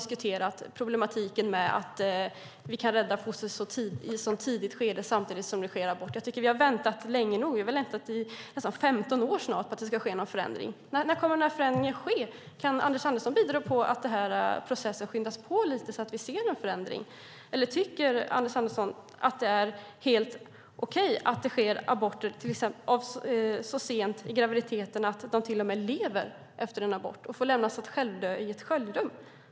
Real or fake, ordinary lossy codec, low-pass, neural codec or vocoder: real; none; none; none